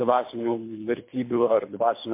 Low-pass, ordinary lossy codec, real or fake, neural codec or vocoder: 3.6 kHz; MP3, 24 kbps; fake; codec, 24 kHz, 3 kbps, HILCodec